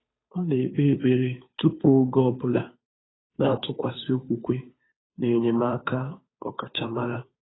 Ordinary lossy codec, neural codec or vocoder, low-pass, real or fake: AAC, 16 kbps; codec, 16 kHz, 2 kbps, FunCodec, trained on Chinese and English, 25 frames a second; 7.2 kHz; fake